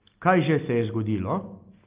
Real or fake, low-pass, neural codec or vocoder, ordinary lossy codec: real; 3.6 kHz; none; Opus, 32 kbps